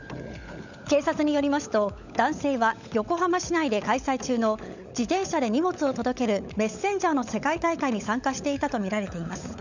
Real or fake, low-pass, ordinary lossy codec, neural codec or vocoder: fake; 7.2 kHz; none; codec, 16 kHz, 16 kbps, FunCodec, trained on LibriTTS, 50 frames a second